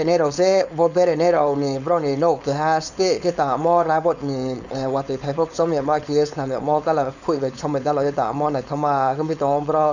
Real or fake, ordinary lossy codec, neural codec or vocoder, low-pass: fake; none; codec, 16 kHz, 4.8 kbps, FACodec; 7.2 kHz